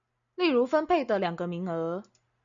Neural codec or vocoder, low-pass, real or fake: none; 7.2 kHz; real